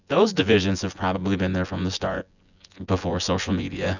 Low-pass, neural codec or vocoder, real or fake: 7.2 kHz; vocoder, 24 kHz, 100 mel bands, Vocos; fake